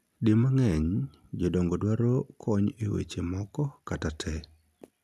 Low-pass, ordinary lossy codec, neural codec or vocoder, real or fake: 14.4 kHz; none; none; real